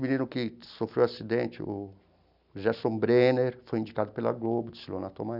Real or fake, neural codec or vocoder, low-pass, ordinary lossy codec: real; none; 5.4 kHz; none